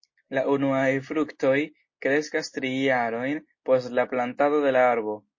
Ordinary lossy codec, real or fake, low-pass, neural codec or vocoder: MP3, 32 kbps; real; 7.2 kHz; none